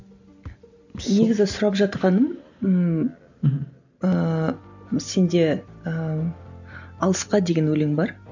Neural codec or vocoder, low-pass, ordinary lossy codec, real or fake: none; 7.2 kHz; none; real